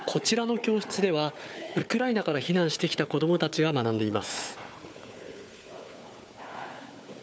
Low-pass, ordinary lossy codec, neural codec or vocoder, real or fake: none; none; codec, 16 kHz, 4 kbps, FunCodec, trained on Chinese and English, 50 frames a second; fake